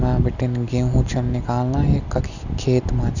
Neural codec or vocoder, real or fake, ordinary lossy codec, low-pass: none; real; none; 7.2 kHz